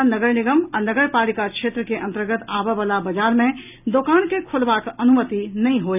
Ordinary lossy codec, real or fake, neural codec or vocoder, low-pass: none; real; none; 3.6 kHz